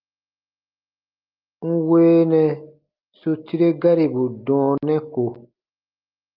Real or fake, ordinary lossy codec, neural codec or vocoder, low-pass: real; Opus, 24 kbps; none; 5.4 kHz